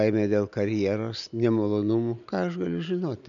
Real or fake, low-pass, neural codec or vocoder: real; 7.2 kHz; none